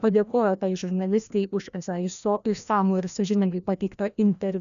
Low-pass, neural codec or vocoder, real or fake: 7.2 kHz; codec, 16 kHz, 1 kbps, FreqCodec, larger model; fake